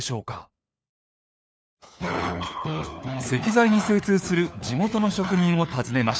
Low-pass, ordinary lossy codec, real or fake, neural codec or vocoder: none; none; fake; codec, 16 kHz, 4 kbps, FunCodec, trained on LibriTTS, 50 frames a second